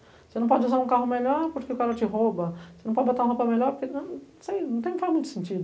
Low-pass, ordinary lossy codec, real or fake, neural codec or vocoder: none; none; real; none